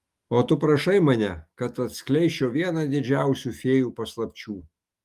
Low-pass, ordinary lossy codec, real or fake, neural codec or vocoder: 14.4 kHz; Opus, 32 kbps; fake; autoencoder, 48 kHz, 128 numbers a frame, DAC-VAE, trained on Japanese speech